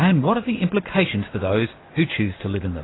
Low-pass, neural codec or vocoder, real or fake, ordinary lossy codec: 7.2 kHz; none; real; AAC, 16 kbps